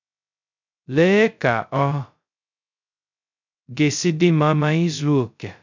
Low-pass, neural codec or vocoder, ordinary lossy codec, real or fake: 7.2 kHz; codec, 16 kHz, 0.2 kbps, FocalCodec; none; fake